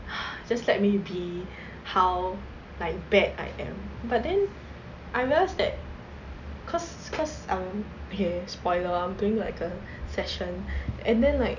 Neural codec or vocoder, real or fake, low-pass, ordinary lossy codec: none; real; 7.2 kHz; none